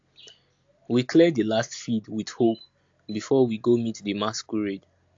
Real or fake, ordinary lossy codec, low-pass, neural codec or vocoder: real; AAC, 48 kbps; 7.2 kHz; none